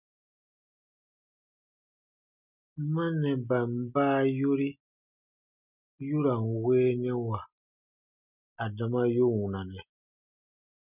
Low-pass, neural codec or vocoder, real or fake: 3.6 kHz; none; real